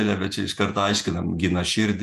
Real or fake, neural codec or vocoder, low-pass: fake; vocoder, 48 kHz, 128 mel bands, Vocos; 14.4 kHz